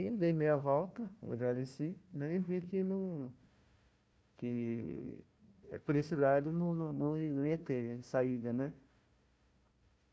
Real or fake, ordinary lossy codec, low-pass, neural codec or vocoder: fake; none; none; codec, 16 kHz, 1 kbps, FunCodec, trained on Chinese and English, 50 frames a second